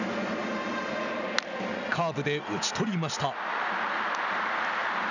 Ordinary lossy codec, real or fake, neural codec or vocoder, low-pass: none; real; none; 7.2 kHz